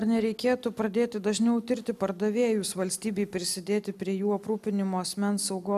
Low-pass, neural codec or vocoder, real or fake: 14.4 kHz; none; real